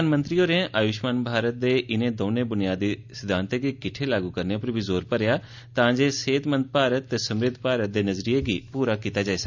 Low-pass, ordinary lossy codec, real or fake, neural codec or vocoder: 7.2 kHz; none; real; none